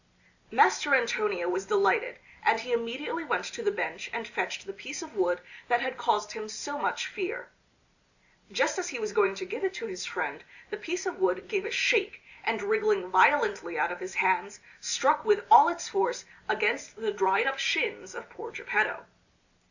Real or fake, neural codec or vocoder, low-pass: real; none; 7.2 kHz